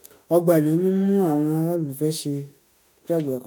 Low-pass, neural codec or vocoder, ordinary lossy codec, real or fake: none; autoencoder, 48 kHz, 32 numbers a frame, DAC-VAE, trained on Japanese speech; none; fake